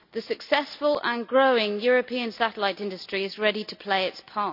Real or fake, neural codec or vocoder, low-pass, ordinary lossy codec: real; none; 5.4 kHz; none